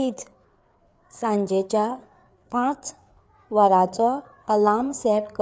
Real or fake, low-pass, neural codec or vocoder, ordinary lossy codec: fake; none; codec, 16 kHz, 4 kbps, FreqCodec, larger model; none